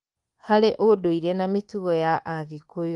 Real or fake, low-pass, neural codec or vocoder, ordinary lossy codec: fake; 10.8 kHz; codec, 24 kHz, 1.2 kbps, DualCodec; Opus, 24 kbps